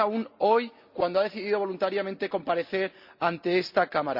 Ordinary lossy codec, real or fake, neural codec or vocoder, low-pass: Opus, 64 kbps; real; none; 5.4 kHz